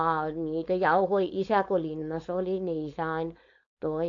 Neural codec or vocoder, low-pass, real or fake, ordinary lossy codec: codec, 16 kHz, 4.8 kbps, FACodec; 7.2 kHz; fake; AAC, 48 kbps